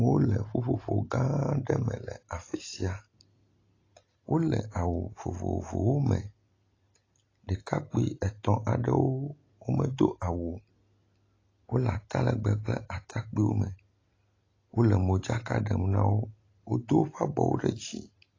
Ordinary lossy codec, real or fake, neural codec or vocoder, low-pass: AAC, 32 kbps; real; none; 7.2 kHz